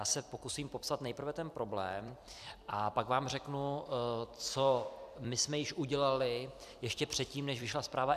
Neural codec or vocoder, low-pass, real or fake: vocoder, 44.1 kHz, 128 mel bands every 256 samples, BigVGAN v2; 14.4 kHz; fake